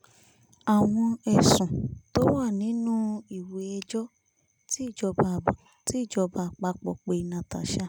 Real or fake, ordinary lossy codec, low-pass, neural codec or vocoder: real; none; none; none